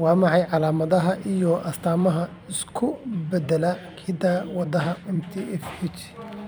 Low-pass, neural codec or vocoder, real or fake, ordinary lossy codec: none; vocoder, 44.1 kHz, 128 mel bands every 256 samples, BigVGAN v2; fake; none